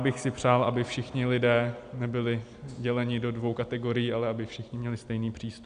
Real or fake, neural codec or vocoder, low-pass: real; none; 9.9 kHz